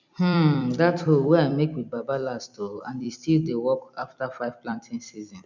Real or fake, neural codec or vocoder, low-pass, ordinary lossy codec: fake; vocoder, 44.1 kHz, 128 mel bands every 256 samples, BigVGAN v2; 7.2 kHz; none